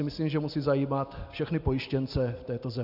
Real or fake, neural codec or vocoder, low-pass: real; none; 5.4 kHz